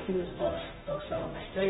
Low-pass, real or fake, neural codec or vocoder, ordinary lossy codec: 7.2 kHz; fake; codec, 16 kHz, 0.5 kbps, FunCodec, trained on Chinese and English, 25 frames a second; AAC, 16 kbps